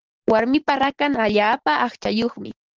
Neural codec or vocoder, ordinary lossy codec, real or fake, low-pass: none; Opus, 16 kbps; real; 7.2 kHz